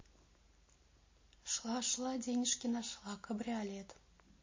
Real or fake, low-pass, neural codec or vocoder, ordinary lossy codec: real; 7.2 kHz; none; MP3, 32 kbps